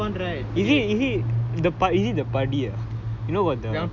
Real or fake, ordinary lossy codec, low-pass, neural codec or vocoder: real; none; 7.2 kHz; none